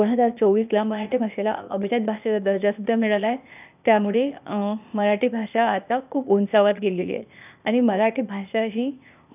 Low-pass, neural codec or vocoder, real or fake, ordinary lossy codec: 3.6 kHz; codec, 16 kHz, 0.8 kbps, ZipCodec; fake; none